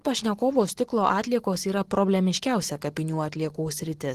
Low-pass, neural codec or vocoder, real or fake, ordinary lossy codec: 14.4 kHz; none; real; Opus, 16 kbps